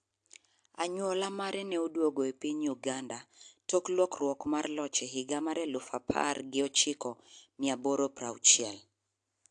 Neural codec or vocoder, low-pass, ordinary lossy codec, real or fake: none; 9.9 kHz; AAC, 64 kbps; real